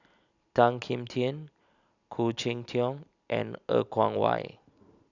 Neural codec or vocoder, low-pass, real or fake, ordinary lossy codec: none; 7.2 kHz; real; none